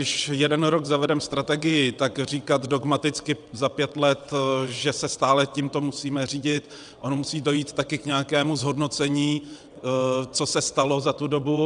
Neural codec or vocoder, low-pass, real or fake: vocoder, 22.05 kHz, 80 mel bands, WaveNeXt; 9.9 kHz; fake